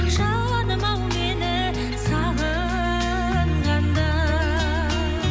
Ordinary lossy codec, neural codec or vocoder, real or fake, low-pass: none; none; real; none